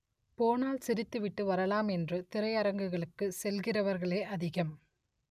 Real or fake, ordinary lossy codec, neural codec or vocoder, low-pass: real; none; none; 14.4 kHz